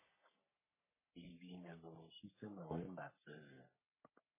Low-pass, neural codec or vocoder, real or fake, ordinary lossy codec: 3.6 kHz; codec, 44.1 kHz, 3.4 kbps, Pupu-Codec; fake; MP3, 16 kbps